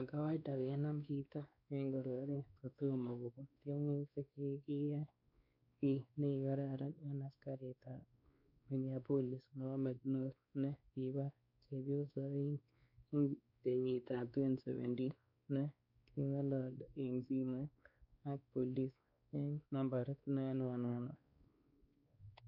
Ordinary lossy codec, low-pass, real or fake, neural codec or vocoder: MP3, 48 kbps; 5.4 kHz; fake; codec, 16 kHz, 2 kbps, X-Codec, WavLM features, trained on Multilingual LibriSpeech